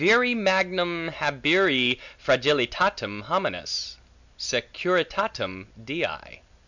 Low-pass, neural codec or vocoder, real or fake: 7.2 kHz; none; real